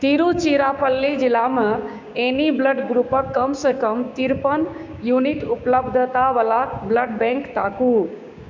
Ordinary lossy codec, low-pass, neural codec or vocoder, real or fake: MP3, 64 kbps; 7.2 kHz; codec, 16 kHz, 6 kbps, DAC; fake